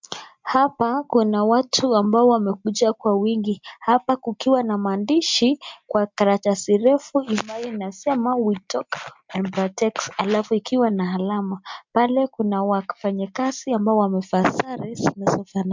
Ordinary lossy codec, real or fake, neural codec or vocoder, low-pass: MP3, 64 kbps; real; none; 7.2 kHz